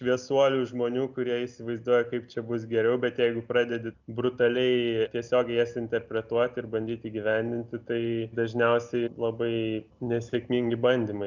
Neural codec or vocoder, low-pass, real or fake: none; 7.2 kHz; real